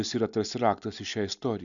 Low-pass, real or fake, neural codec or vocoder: 7.2 kHz; real; none